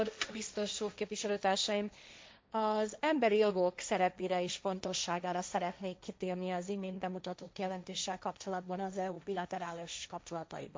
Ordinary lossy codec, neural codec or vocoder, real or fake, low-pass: none; codec, 16 kHz, 1.1 kbps, Voila-Tokenizer; fake; none